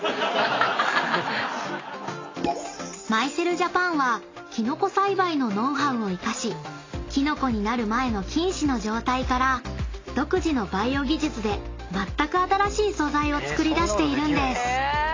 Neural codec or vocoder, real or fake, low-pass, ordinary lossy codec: none; real; 7.2 kHz; AAC, 32 kbps